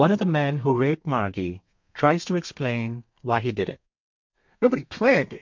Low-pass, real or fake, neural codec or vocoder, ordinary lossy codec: 7.2 kHz; fake; codec, 32 kHz, 1.9 kbps, SNAC; MP3, 48 kbps